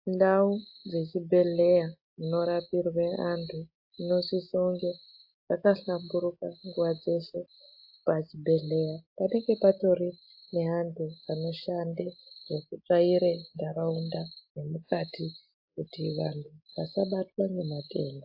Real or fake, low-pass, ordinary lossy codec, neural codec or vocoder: real; 5.4 kHz; AAC, 48 kbps; none